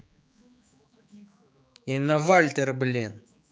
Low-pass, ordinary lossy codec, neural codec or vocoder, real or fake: none; none; codec, 16 kHz, 4 kbps, X-Codec, HuBERT features, trained on general audio; fake